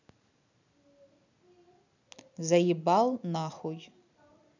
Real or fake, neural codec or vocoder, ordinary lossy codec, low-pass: real; none; none; 7.2 kHz